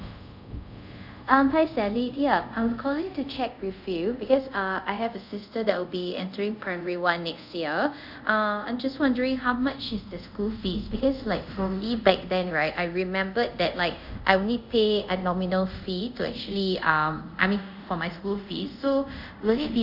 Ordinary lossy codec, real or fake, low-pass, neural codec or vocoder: none; fake; 5.4 kHz; codec, 24 kHz, 0.5 kbps, DualCodec